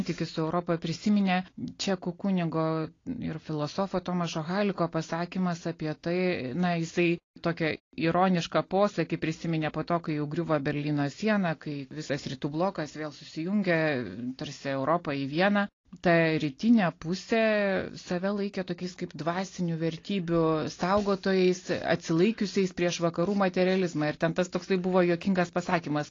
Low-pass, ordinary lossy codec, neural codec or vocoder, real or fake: 7.2 kHz; AAC, 32 kbps; none; real